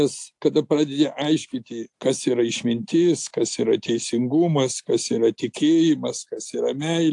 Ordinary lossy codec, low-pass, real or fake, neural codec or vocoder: AAC, 64 kbps; 10.8 kHz; real; none